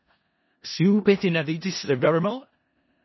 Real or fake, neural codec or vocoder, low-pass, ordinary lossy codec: fake; codec, 16 kHz in and 24 kHz out, 0.4 kbps, LongCat-Audio-Codec, four codebook decoder; 7.2 kHz; MP3, 24 kbps